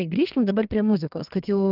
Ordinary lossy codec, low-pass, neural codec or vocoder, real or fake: Opus, 32 kbps; 5.4 kHz; codec, 32 kHz, 1.9 kbps, SNAC; fake